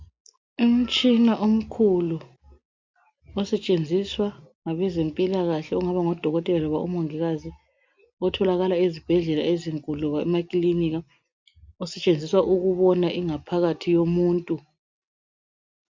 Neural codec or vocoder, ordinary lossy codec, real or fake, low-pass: none; MP3, 64 kbps; real; 7.2 kHz